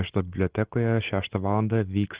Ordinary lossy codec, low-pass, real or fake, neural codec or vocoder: Opus, 16 kbps; 3.6 kHz; real; none